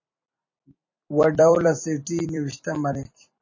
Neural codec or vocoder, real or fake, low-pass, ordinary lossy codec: vocoder, 44.1 kHz, 128 mel bands every 256 samples, BigVGAN v2; fake; 7.2 kHz; MP3, 32 kbps